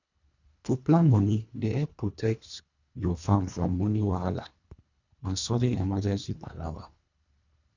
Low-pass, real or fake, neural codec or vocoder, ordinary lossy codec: 7.2 kHz; fake; codec, 24 kHz, 1.5 kbps, HILCodec; none